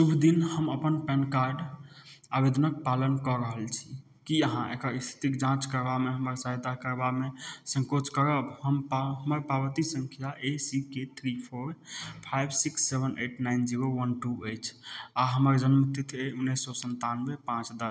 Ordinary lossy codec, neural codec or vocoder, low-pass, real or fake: none; none; none; real